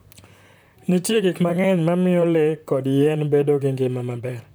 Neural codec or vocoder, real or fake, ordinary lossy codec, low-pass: vocoder, 44.1 kHz, 128 mel bands, Pupu-Vocoder; fake; none; none